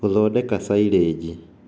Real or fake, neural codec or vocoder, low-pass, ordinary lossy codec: real; none; none; none